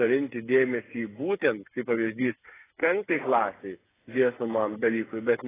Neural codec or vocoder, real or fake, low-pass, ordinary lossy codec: codec, 16 kHz, 8 kbps, FreqCodec, smaller model; fake; 3.6 kHz; AAC, 16 kbps